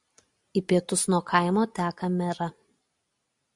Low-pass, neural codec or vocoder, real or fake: 10.8 kHz; none; real